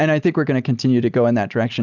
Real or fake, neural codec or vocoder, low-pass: real; none; 7.2 kHz